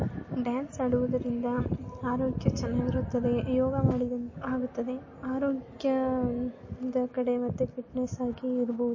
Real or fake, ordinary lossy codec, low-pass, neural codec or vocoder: real; MP3, 32 kbps; 7.2 kHz; none